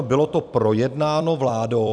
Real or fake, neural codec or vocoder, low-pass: fake; vocoder, 44.1 kHz, 128 mel bands every 256 samples, BigVGAN v2; 9.9 kHz